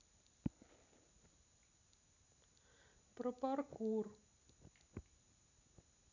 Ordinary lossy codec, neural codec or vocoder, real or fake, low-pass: none; none; real; 7.2 kHz